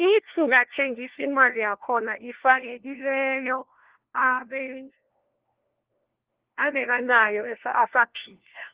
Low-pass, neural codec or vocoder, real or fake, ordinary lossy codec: 3.6 kHz; codec, 16 kHz, 1 kbps, FunCodec, trained on LibriTTS, 50 frames a second; fake; Opus, 16 kbps